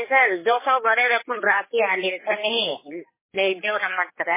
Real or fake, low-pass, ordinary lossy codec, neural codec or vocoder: fake; 3.6 kHz; MP3, 16 kbps; codec, 16 kHz, 4 kbps, X-Codec, HuBERT features, trained on general audio